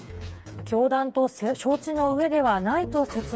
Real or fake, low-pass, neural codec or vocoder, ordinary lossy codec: fake; none; codec, 16 kHz, 4 kbps, FreqCodec, smaller model; none